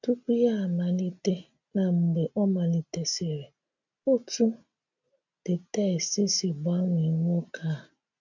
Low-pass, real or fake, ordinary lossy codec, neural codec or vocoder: 7.2 kHz; real; none; none